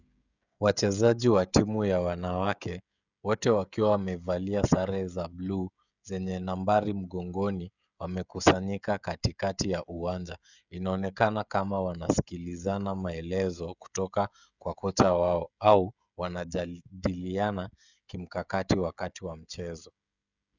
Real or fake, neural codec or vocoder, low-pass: fake; codec, 16 kHz, 16 kbps, FreqCodec, smaller model; 7.2 kHz